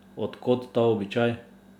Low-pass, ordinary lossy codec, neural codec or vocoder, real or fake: 19.8 kHz; none; none; real